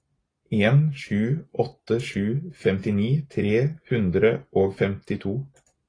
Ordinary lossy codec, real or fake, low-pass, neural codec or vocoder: AAC, 32 kbps; real; 9.9 kHz; none